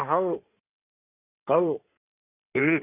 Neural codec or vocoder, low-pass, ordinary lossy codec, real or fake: codec, 16 kHz in and 24 kHz out, 2.2 kbps, FireRedTTS-2 codec; 3.6 kHz; none; fake